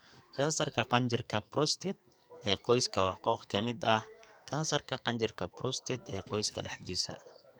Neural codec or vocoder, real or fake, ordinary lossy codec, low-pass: codec, 44.1 kHz, 2.6 kbps, SNAC; fake; none; none